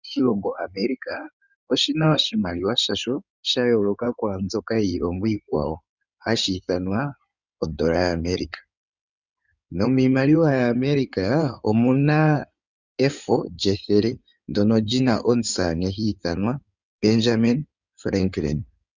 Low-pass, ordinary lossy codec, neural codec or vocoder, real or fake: 7.2 kHz; Opus, 64 kbps; codec, 16 kHz in and 24 kHz out, 2.2 kbps, FireRedTTS-2 codec; fake